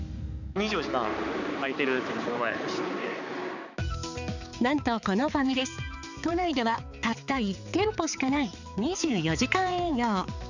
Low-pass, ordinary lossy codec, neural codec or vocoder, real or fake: 7.2 kHz; none; codec, 16 kHz, 4 kbps, X-Codec, HuBERT features, trained on balanced general audio; fake